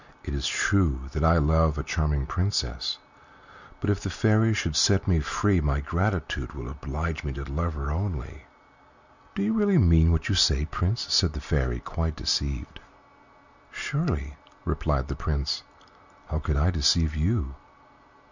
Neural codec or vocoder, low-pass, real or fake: none; 7.2 kHz; real